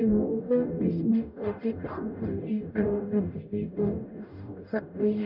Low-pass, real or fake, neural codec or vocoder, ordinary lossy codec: 5.4 kHz; fake; codec, 44.1 kHz, 0.9 kbps, DAC; none